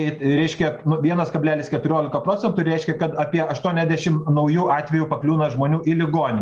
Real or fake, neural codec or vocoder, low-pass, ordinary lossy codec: real; none; 10.8 kHz; Opus, 24 kbps